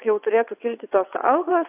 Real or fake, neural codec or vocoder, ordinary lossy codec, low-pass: fake; vocoder, 22.05 kHz, 80 mel bands, WaveNeXt; AAC, 32 kbps; 3.6 kHz